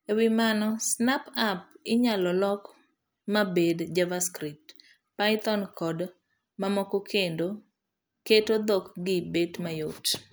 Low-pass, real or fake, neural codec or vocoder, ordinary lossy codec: none; real; none; none